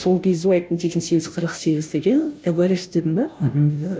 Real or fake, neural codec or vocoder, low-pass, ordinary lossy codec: fake; codec, 16 kHz, 0.5 kbps, FunCodec, trained on Chinese and English, 25 frames a second; none; none